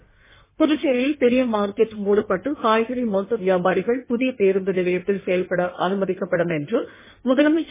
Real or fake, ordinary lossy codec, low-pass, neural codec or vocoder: fake; MP3, 16 kbps; 3.6 kHz; codec, 16 kHz in and 24 kHz out, 1.1 kbps, FireRedTTS-2 codec